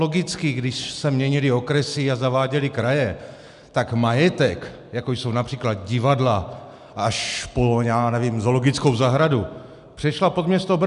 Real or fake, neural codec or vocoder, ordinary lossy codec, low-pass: real; none; MP3, 96 kbps; 10.8 kHz